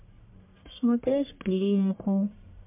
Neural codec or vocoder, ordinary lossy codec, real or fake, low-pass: codec, 44.1 kHz, 1.7 kbps, Pupu-Codec; MP3, 32 kbps; fake; 3.6 kHz